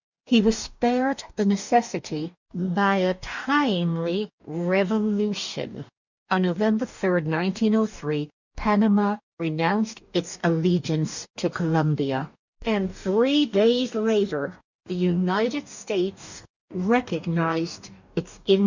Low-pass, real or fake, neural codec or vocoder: 7.2 kHz; fake; codec, 44.1 kHz, 2.6 kbps, DAC